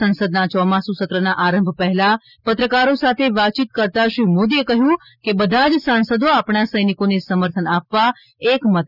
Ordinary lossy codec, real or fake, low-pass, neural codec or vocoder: none; real; 5.4 kHz; none